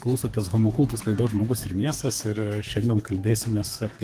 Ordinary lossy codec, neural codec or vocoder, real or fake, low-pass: Opus, 24 kbps; codec, 32 kHz, 1.9 kbps, SNAC; fake; 14.4 kHz